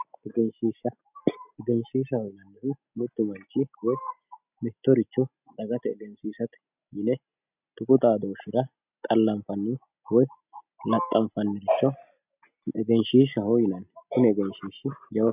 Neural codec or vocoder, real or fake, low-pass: none; real; 3.6 kHz